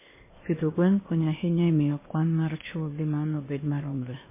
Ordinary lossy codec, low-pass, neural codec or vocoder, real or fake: MP3, 16 kbps; 3.6 kHz; codec, 16 kHz, 0.8 kbps, ZipCodec; fake